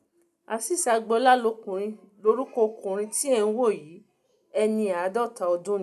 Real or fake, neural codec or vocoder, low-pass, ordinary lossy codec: real; none; 14.4 kHz; none